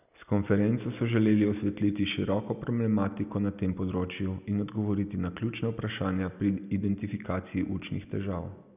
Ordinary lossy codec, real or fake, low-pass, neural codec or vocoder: none; real; 3.6 kHz; none